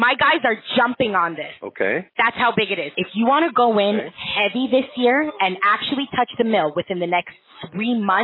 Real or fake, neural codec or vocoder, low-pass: real; none; 5.4 kHz